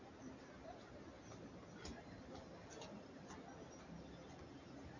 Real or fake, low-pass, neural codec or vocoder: real; 7.2 kHz; none